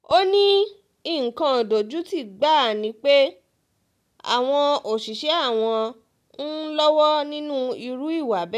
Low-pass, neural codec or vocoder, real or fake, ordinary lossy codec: 14.4 kHz; none; real; none